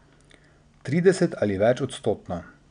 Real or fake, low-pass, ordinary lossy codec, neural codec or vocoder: real; 9.9 kHz; none; none